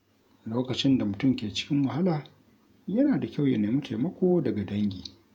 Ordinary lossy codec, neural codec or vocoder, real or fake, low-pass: none; vocoder, 48 kHz, 128 mel bands, Vocos; fake; 19.8 kHz